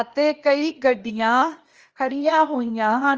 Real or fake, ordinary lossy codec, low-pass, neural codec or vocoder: fake; Opus, 24 kbps; 7.2 kHz; codec, 16 kHz, 0.8 kbps, ZipCodec